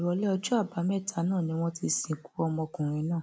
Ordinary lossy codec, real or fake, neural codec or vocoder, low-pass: none; real; none; none